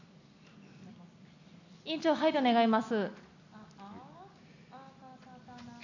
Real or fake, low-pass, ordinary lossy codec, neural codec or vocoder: real; 7.2 kHz; none; none